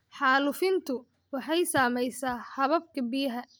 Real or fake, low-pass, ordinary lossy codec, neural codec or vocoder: real; none; none; none